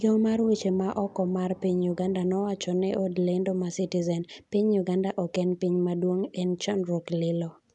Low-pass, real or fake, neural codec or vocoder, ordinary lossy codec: 10.8 kHz; real; none; none